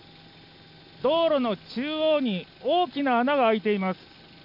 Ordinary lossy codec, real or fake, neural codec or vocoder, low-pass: none; real; none; 5.4 kHz